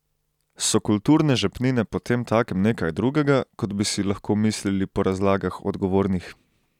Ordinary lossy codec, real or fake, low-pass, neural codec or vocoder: none; real; 19.8 kHz; none